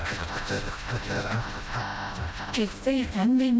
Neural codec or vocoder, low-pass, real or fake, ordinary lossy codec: codec, 16 kHz, 0.5 kbps, FreqCodec, smaller model; none; fake; none